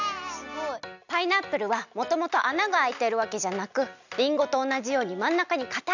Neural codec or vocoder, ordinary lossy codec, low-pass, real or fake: none; none; 7.2 kHz; real